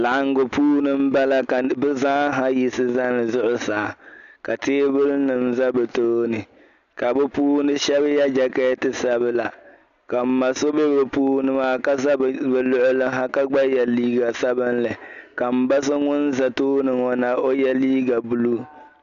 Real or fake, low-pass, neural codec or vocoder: real; 7.2 kHz; none